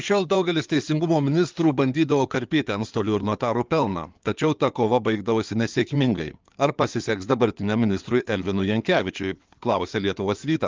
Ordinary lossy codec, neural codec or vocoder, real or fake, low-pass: Opus, 24 kbps; codec, 16 kHz in and 24 kHz out, 2.2 kbps, FireRedTTS-2 codec; fake; 7.2 kHz